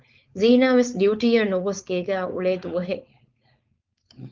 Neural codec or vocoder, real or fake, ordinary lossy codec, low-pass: codec, 16 kHz, 4.8 kbps, FACodec; fake; Opus, 32 kbps; 7.2 kHz